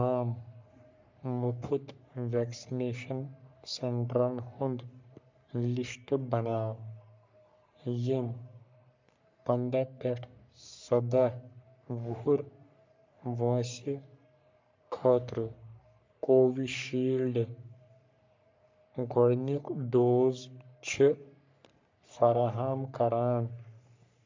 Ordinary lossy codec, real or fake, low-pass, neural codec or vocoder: MP3, 64 kbps; fake; 7.2 kHz; codec, 44.1 kHz, 3.4 kbps, Pupu-Codec